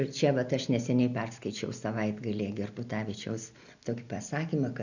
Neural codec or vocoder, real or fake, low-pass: none; real; 7.2 kHz